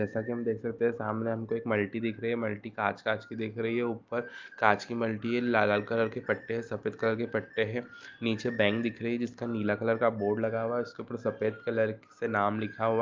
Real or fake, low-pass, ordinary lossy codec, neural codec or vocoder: real; 7.2 kHz; Opus, 24 kbps; none